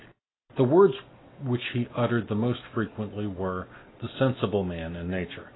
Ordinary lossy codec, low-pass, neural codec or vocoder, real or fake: AAC, 16 kbps; 7.2 kHz; none; real